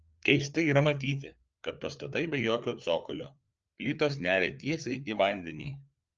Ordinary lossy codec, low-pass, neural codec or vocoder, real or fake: Opus, 24 kbps; 7.2 kHz; codec, 16 kHz, 4 kbps, FreqCodec, larger model; fake